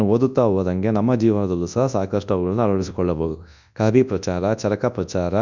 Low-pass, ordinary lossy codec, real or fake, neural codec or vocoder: 7.2 kHz; none; fake; codec, 24 kHz, 0.9 kbps, WavTokenizer, large speech release